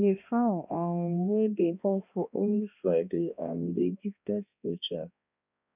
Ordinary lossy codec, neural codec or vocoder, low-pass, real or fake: none; codec, 16 kHz, 1 kbps, X-Codec, HuBERT features, trained on balanced general audio; 3.6 kHz; fake